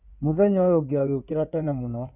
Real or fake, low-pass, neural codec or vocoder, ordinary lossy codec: fake; 3.6 kHz; codec, 16 kHz in and 24 kHz out, 2.2 kbps, FireRedTTS-2 codec; none